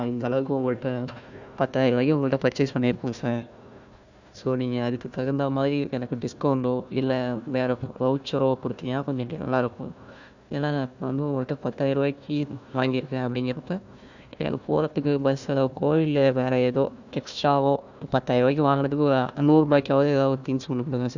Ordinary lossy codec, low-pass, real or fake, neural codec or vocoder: none; 7.2 kHz; fake; codec, 16 kHz, 1 kbps, FunCodec, trained on Chinese and English, 50 frames a second